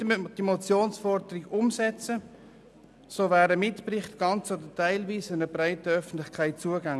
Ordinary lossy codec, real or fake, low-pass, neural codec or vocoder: none; real; none; none